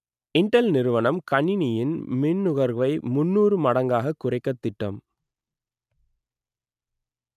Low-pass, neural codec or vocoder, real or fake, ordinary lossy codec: 14.4 kHz; none; real; none